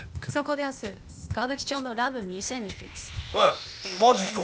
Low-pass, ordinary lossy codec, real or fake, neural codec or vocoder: none; none; fake; codec, 16 kHz, 0.8 kbps, ZipCodec